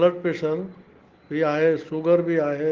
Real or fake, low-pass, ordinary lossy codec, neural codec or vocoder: real; 7.2 kHz; Opus, 16 kbps; none